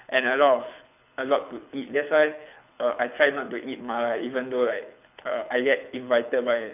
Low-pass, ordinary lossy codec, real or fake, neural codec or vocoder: 3.6 kHz; none; fake; codec, 24 kHz, 6 kbps, HILCodec